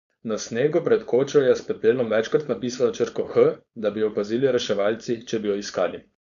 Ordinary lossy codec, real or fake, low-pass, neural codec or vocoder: none; fake; 7.2 kHz; codec, 16 kHz, 4.8 kbps, FACodec